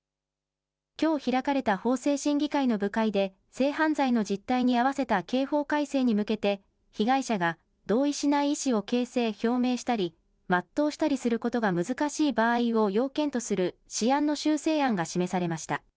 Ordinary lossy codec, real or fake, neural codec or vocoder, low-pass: none; real; none; none